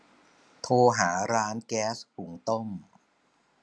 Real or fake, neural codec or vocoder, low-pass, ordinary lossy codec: real; none; none; none